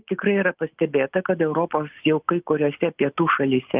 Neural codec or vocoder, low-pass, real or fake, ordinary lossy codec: none; 3.6 kHz; real; Opus, 24 kbps